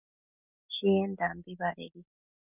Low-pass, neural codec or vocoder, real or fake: 3.6 kHz; none; real